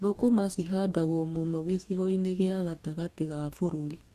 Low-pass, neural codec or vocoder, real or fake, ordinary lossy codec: 14.4 kHz; codec, 44.1 kHz, 2.6 kbps, DAC; fake; Opus, 64 kbps